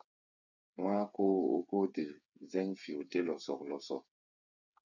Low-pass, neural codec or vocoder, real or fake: 7.2 kHz; codec, 16 kHz, 8 kbps, FreqCodec, smaller model; fake